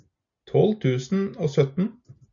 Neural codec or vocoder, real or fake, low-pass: none; real; 7.2 kHz